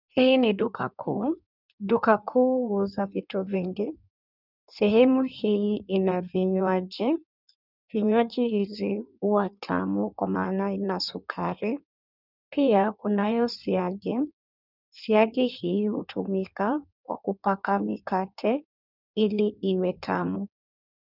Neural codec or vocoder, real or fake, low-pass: codec, 16 kHz in and 24 kHz out, 1.1 kbps, FireRedTTS-2 codec; fake; 5.4 kHz